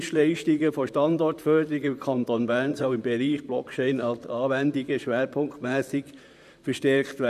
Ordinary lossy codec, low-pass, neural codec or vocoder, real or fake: none; 14.4 kHz; vocoder, 44.1 kHz, 128 mel bands, Pupu-Vocoder; fake